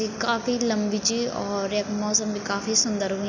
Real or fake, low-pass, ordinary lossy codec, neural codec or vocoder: real; 7.2 kHz; none; none